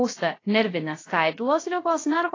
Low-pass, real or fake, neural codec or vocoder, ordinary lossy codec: 7.2 kHz; fake; codec, 16 kHz, 0.3 kbps, FocalCodec; AAC, 32 kbps